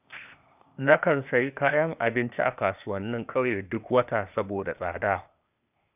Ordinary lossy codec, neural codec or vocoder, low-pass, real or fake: none; codec, 16 kHz, 0.8 kbps, ZipCodec; 3.6 kHz; fake